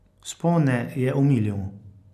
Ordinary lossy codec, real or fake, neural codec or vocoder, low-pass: none; real; none; 14.4 kHz